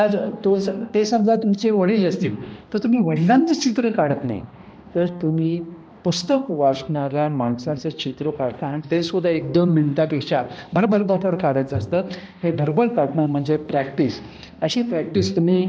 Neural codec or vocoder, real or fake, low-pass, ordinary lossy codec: codec, 16 kHz, 1 kbps, X-Codec, HuBERT features, trained on balanced general audio; fake; none; none